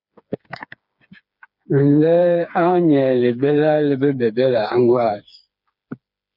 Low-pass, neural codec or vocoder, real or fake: 5.4 kHz; codec, 16 kHz, 4 kbps, FreqCodec, smaller model; fake